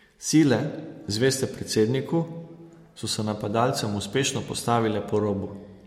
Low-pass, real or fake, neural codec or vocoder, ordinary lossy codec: 19.8 kHz; real; none; MP3, 64 kbps